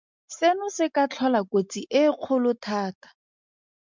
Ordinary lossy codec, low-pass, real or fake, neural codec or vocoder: MP3, 64 kbps; 7.2 kHz; real; none